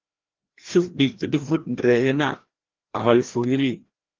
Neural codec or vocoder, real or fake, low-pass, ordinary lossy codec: codec, 16 kHz, 1 kbps, FreqCodec, larger model; fake; 7.2 kHz; Opus, 16 kbps